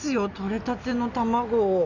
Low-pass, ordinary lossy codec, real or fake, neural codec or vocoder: 7.2 kHz; none; real; none